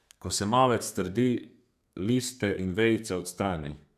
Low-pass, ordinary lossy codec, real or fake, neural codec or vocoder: 14.4 kHz; none; fake; codec, 32 kHz, 1.9 kbps, SNAC